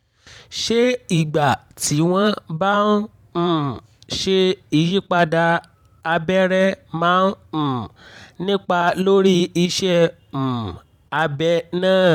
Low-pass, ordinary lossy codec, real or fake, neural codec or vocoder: 19.8 kHz; none; fake; vocoder, 44.1 kHz, 128 mel bands every 512 samples, BigVGAN v2